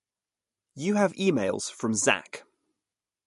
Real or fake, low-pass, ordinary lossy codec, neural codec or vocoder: real; 14.4 kHz; MP3, 48 kbps; none